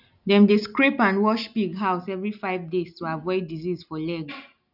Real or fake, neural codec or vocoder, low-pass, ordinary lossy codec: real; none; 5.4 kHz; none